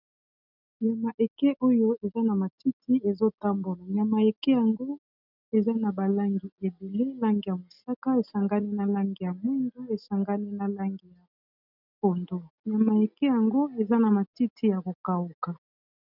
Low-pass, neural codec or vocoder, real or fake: 5.4 kHz; none; real